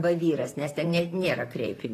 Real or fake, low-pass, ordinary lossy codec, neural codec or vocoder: fake; 14.4 kHz; AAC, 48 kbps; vocoder, 44.1 kHz, 128 mel bands, Pupu-Vocoder